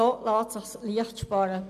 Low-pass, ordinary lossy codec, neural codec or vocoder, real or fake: 14.4 kHz; none; none; real